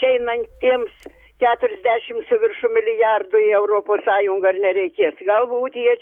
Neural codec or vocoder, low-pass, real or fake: codec, 44.1 kHz, 7.8 kbps, DAC; 19.8 kHz; fake